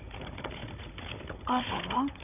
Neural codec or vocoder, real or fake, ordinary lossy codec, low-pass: codec, 16 kHz, 16 kbps, FunCodec, trained on Chinese and English, 50 frames a second; fake; none; 3.6 kHz